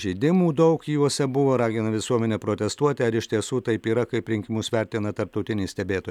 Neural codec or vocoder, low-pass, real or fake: none; 19.8 kHz; real